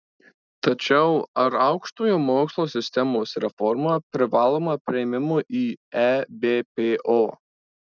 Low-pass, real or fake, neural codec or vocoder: 7.2 kHz; real; none